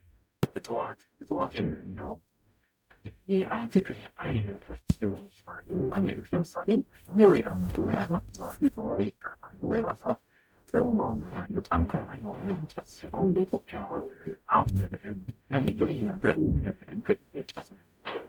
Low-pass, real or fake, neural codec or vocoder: 19.8 kHz; fake; codec, 44.1 kHz, 0.9 kbps, DAC